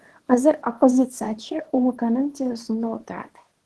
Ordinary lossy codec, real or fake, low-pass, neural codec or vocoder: Opus, 16 kbps; fake; 10.8 kHz; codec, 24 kHz, 0.9 kbps, WavTokenizer, small release